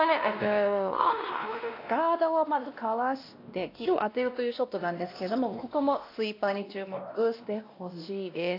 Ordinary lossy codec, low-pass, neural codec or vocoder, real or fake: AAC, 32 kbps; 5.4 kHz; codec, 16 kHz, 1 kbps, X-Codec, WavLM features, trained on Multilingual LibriSpeech; fake